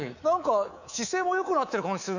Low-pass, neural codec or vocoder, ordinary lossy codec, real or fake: 7.2 kHz; codec, 24 kHz, 3.1 kbps, DualCodec; none; fake